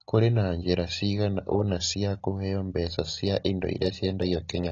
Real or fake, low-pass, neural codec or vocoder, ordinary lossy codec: real; 7.2 kHz; none; AAC, 32 kbps